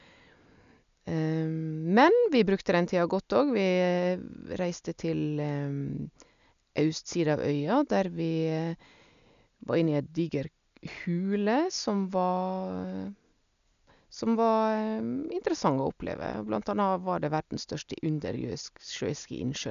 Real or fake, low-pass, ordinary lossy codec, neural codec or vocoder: real; 7.2 kHz; none; none